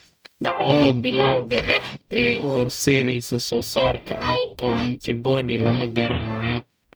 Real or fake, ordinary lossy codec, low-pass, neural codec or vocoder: fake; none; none; codec, 44.1 kHz, 0.9 kbps, DAC